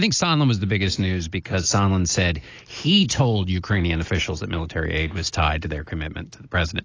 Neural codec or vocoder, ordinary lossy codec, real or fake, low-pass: none; AAC, 32 kbps; real; 7.2 kHz